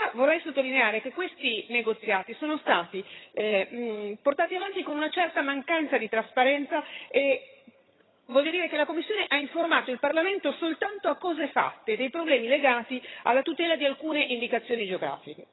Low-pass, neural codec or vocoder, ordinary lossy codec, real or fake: 7.2 kHz; vocoder, 22.05 kHz, 80 mel bands, HiFi-GAN; AAC, 16 kbps; fake